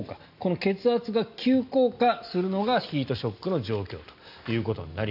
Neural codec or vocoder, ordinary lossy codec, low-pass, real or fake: none; none; 5.4 kHz; real